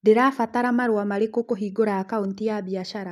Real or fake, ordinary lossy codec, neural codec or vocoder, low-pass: real; none; none; 14.4 kHz